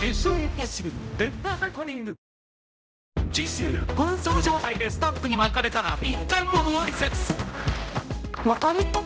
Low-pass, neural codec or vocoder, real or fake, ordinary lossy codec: none; codec, 16 kHz, 0.5 kbps, X-Codec, HuBERT features, trained on general audio; fake; none